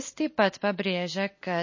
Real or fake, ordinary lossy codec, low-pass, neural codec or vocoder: real; MP3, 32 kbps; 7.2 kHz; none